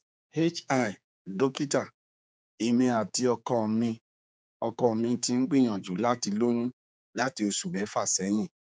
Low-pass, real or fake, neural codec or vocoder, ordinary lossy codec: none; fake; codec, 16 kHz, 4 kbps, X-Codec, HuBERT features, trained on general audio; none